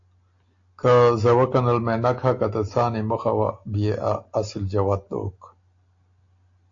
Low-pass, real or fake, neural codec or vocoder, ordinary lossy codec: 7.2 kHz; real; none; AAC, 48 kbps